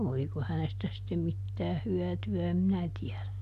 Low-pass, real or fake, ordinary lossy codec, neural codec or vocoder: 14.4 kHz; real; none; none